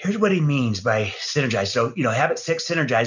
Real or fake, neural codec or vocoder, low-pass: real; none; 7.2 kHz